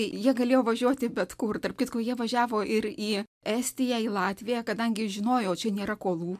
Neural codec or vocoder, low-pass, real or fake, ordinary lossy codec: none; 14.4 kHz; real; MP3, 96 kbps